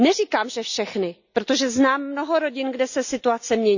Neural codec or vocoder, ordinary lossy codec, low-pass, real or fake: none; none; 7.2 kHz; real